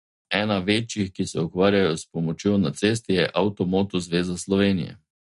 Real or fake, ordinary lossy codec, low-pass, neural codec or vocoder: real; MP3, 48 kbps; 14.4 kHz; none